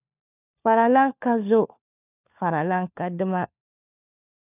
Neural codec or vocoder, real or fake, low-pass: codec, 16 kHz, 4 kbps, FunCodec, trained on LibriTTS, 50 frames a second; fake; 3.6 kHz